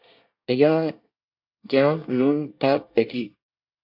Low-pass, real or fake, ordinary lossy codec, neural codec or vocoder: 5.4 kHz; fake; AAC, 48 kbps; codec, 24 kHz, 1 kbps, SNAC